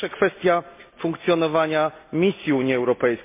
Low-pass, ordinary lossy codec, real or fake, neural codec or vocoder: 3.6 kHz; MP3, 24 kbps; real; none